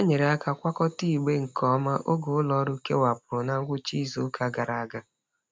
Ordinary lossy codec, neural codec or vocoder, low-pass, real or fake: none; none; none; real